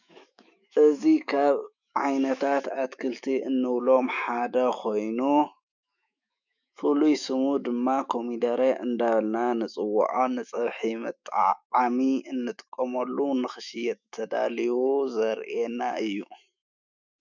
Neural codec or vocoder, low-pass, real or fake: autoencoder, 48 kHz, 128 numbers a frame, DAC-VAE, trained on Japanese speech; 7.2 kHz; fake